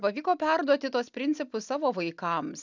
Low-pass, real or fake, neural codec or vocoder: 7.2 kHz; real; none